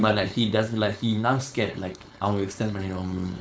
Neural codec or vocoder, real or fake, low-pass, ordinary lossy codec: codec, 16 kHz, 4.8 kbps, FACodec; fake; none; none